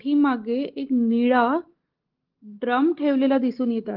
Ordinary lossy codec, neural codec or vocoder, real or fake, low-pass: Opus, 16 kbps; none; real; 5.4 kHz